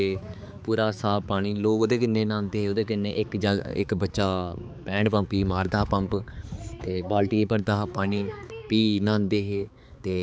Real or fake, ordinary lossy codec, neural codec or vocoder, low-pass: fake; none; codec, 16 kHz, 4 kbps, X-Codec, HuBERT features, trained on balanced general audio; none